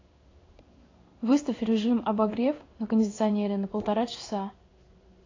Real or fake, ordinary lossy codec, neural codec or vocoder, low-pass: fake; AAC, 32 kbps; codec, 16 kHz in and 24 kHz out, 1 kbps, XY-Tokenizer; 7.2 kHz